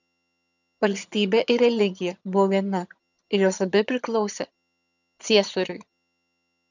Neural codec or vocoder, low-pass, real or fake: vocoder, 22.05 kHz, 80 mel bands, HiFi-GAN; 7.2 kHz; fake